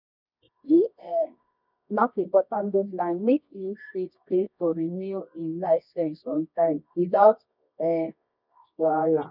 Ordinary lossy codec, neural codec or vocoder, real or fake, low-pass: none; codec, 24 kHz, 0.9 kbps, WavTokenizer, medium music audio release; fake; 5.4 kHz